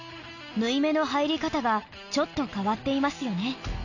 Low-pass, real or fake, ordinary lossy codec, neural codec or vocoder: 7.2 kHz; real; none; none